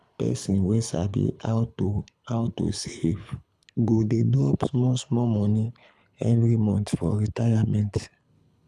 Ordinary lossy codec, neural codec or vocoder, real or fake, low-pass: none; codec, 24 kHz, 6 kbps, HILCodec; fake; none